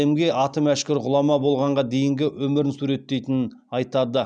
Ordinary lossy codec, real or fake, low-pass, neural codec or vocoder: none; real; 9.9 kHz; none